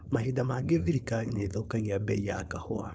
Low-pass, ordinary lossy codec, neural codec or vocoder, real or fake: none; none; codec, 16 kHz, 4.8 kbps, FACodec; fake